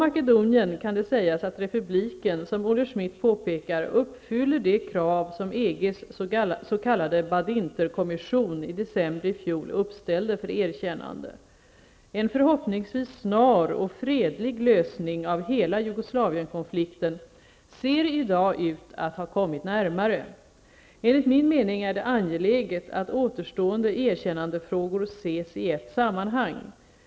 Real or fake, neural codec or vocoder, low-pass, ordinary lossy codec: real; none; none; none